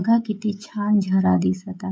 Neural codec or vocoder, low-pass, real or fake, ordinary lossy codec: codec, 16 kHz, 16 kbps, FreqCodec, smaller model; none; fake; none